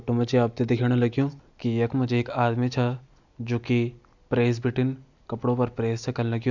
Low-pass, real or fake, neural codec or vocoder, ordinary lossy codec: 7.2 kHz; real; none; none